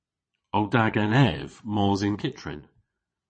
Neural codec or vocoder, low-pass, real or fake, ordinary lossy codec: vocoder, 22.05 kHz, 80 mel bands, Vocos; 9.9 kHz; fake; MP3, 32 kbps